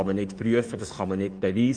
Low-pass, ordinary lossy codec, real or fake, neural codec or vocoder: 9.9 kHz; none; fake; autoencoder, 48 kHz, 32 numbers a frame, DAC-VAE, trained on Japanese speech